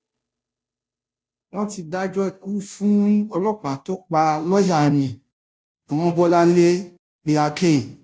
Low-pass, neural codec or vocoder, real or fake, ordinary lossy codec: none; codec, 16 kHz, 0.5 kbps, FunCodec, trained on Chinese and English, 25 frames a second; fake; none